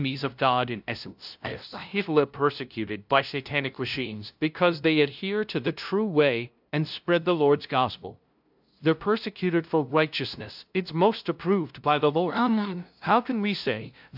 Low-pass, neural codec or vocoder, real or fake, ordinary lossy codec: 5.4 kHz; codec, 16 kHz, 0.5 kbps, FunCodec, trained on LibriTTS, 25 frames a second; fake; AAC, 48 kbps